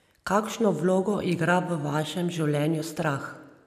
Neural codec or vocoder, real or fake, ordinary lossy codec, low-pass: none; real; none; 14.4 kHz